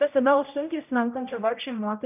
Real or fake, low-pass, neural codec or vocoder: fake; 3.6 kHz; codec, 16 kHz, 0.5 kbps, X-Codec, HuBERT features, trained on general audio